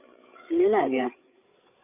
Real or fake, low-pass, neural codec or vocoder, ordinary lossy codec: fake; 3.6 kHz; codec, 16 kHz, 8 kbps, FreqCodec, larger model; none